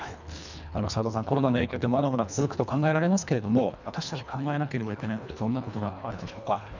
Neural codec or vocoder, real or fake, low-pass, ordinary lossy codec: codec, 24 kHz, 1.5 kbps, HILCodec; fake; 7.2 kHz; none